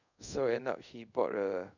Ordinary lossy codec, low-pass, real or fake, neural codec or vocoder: none; 7.2 kHz; fake; codec, 24 kHz, 0.5 kbps, DualCodec